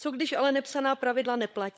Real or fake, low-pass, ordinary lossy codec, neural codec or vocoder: fake; none; none; codec, 16 kHz, 16 kbps, FunCodec, trained on LibriTTS, 50 frames a second